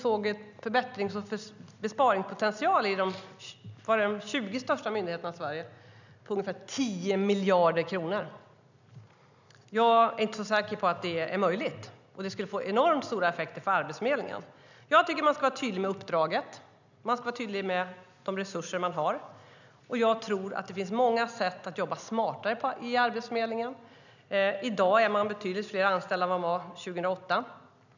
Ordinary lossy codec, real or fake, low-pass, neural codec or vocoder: none; real; 7.2 kHz; none